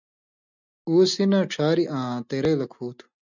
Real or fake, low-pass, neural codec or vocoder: real; 7.2 kHz; none